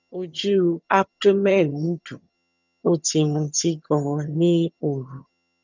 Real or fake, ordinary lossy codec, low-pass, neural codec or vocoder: fake; none; 7.2 kHz; vocoder, 22.05 kHz, 80 mel bands, HiFi-GAN